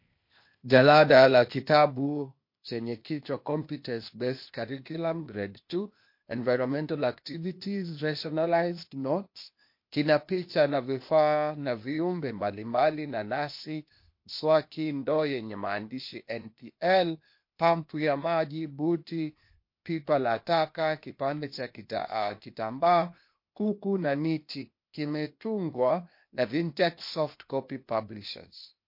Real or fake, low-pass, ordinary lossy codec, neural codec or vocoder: fake; 5.4 kHz; MP3, 32 kbps; codec, 16 kHz, 0.8 kbps, ZipCodec